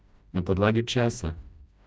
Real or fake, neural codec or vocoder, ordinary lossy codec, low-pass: fake; codec, 16 kHz, 1 kbps, FreqCodec, smaller model; none; none